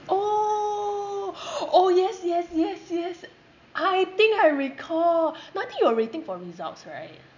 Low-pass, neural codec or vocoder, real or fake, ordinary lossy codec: 7.2 kHz; none; real; none